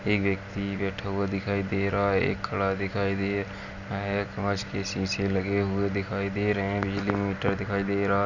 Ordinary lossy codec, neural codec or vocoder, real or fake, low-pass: none; none; real; 7.2 kHz